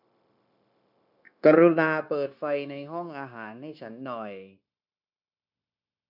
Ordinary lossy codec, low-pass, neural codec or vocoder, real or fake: none; 5.4 kHz; codec, 16 kHz, 0.9 kbps, LongCat-Audio-Codec; fake